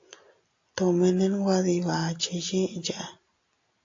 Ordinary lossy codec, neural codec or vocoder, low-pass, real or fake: AAC, 48 kbps; none; 7.2 kHz; real